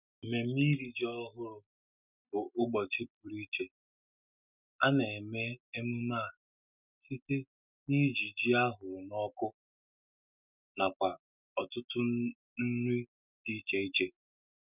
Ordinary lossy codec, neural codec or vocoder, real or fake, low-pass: none; none; real; 3.6 kHz